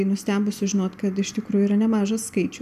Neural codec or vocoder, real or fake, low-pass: none; real; 14.4 kHz